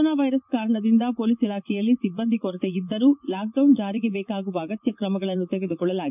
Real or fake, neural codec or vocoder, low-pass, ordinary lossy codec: fake; vocoder, 44.1 kHz, 80 mel bands, Vocos; 3.6 kHz; none